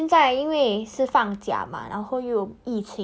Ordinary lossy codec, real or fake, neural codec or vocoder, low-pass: none; real; none; none